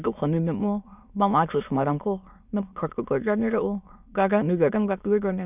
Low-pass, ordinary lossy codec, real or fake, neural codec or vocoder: 3.6 kHz; none; fake; autoencoder, 22.05 kHz, a latent of 192 numbers a frame, VITS, trained on many speakers